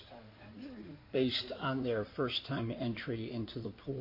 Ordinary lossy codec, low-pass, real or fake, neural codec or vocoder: MP3, 32 kbps; 5.4 kHz; fake; codec, 16 kHz in and 24 kHz out, 2.2 kbps, FireRedTTS-2 codec